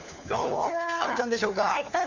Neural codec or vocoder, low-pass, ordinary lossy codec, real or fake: codec, 16 kHz, 4 kbps, FunCodec, trained on LibriTTS, 50 frames a second; 7.2 kHz; none; fake